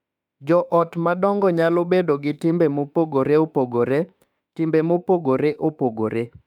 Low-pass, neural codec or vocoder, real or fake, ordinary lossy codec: 19.8 kHz; autoencoder, 48 kHz, 32 numbers a frame, DAC-VAE, trained on Japanese speech; fake; none